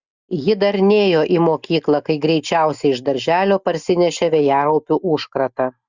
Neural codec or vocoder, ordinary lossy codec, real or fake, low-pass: none; Opus, 64 kbps; real; 7.2 kHz